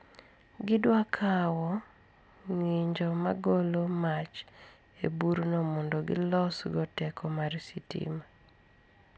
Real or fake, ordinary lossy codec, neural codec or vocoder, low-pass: real; none; none; none